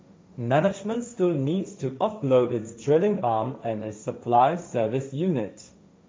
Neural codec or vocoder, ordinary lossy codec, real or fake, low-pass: codec, 16 kHz, 1.1 kbps, Voila-Tokenizer; none; fake; none